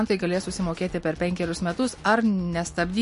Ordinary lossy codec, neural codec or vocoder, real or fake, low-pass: MP3, 48 kbps; none; real; 10.8 kHz